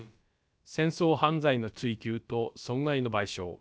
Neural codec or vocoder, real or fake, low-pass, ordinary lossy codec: codec, 16 kHz, about 1 kbps, DyCAST, with the encoder's durations; fake; none; none